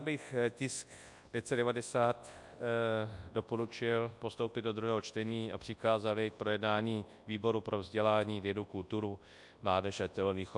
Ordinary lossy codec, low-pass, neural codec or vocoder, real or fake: AAC, 64 kbps; 10.8 kHz; codec, 24 kHz, 0.9 kbps, WavTokenizer, large speech release; fake